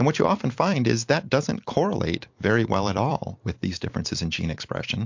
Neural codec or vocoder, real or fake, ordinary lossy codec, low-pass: vocoder, 44.1 kHz, 128 mel bands every 256 samples, BigVGAN v2; fake; MP3, 48 kbps; 7.2 kHz